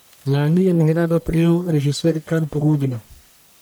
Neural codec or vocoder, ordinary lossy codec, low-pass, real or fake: codec, 44.1 kHz, 1.7 kbps, Pupu-Codec; none; none; fake